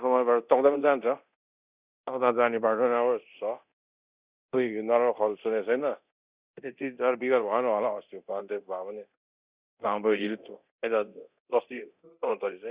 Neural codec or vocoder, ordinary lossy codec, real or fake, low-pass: codec, 24 kHz, 0.9 kbps, DualCodec; Opus, 64 kbps; fake; 3.6 kHz